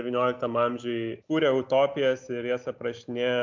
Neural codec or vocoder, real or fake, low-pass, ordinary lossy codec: none; real; 7.2 kHz; MP3, 64 kbps